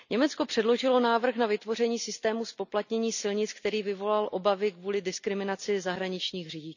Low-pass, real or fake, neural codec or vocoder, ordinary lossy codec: 7.2 kHz; real; none; none